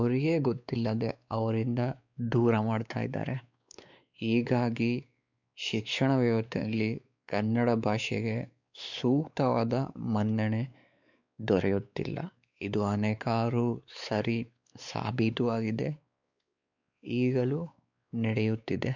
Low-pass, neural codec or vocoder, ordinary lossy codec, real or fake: 7.2 kHz; codec, 16 kHz, 4 kbps, X-Codec, WavLM features, trained on Multilingual LibriSpeech; none; fake